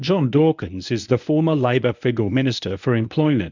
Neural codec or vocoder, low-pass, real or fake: codec, 24 kHz, 0.9 kbps, WavTokenizer, medium speech release version 1; 7.2 kHz; fake